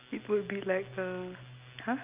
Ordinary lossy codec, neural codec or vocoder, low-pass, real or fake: Opus, 24 kbps; none; 3.6 kHz; real